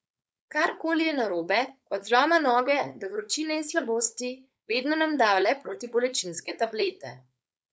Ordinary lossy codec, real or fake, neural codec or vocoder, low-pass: none; fake; codec, 16 kHz, 4.8 kbps, FACodec; none